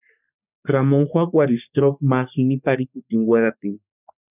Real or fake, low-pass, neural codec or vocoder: fake; 3.6 kHz; codec, 44.1 kHz, 3.4 kbps, Pupu-Codec